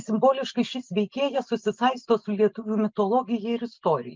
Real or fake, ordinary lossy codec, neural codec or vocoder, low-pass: real; Opus, 32 kbps; none; 7.2 kHz